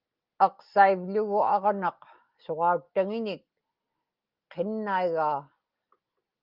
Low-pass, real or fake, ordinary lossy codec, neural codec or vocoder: 5.4 kHz; real; Opus, 24 kbps; none